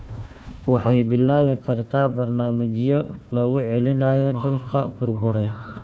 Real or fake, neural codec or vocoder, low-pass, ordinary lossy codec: fake; codec, 16 kHz, 1 kbps, FunCodec, trained on Chinese and English, 50 frames a second; none; none